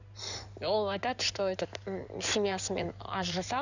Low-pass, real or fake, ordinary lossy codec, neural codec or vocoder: 7.2 kHz; fake; none; codec, 16 kHz in and 24 kHz out, 2.2 kbps, FireRedTTS-2 codec